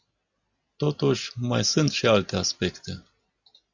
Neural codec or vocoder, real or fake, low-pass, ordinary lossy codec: none; real; 7.2 kHz; Opus, 64 kbps